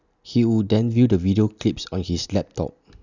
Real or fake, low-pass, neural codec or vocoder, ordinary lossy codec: real; 7.2 kHz; none; none